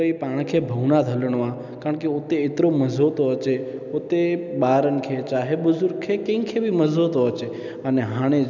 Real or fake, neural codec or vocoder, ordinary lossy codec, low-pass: real; none; none; 7.2 kHz